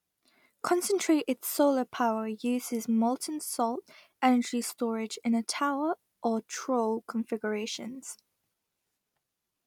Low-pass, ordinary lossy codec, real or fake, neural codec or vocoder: 19.8 kHz; none; real; none